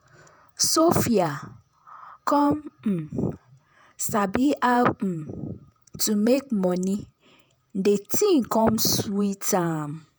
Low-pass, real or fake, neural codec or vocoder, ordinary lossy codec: none; fake; vocoder, 48 kHz, 128 mel bands, Vocos; none